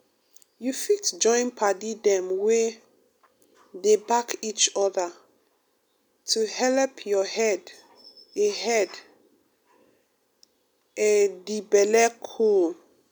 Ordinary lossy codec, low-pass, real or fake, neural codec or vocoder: none; none; real; none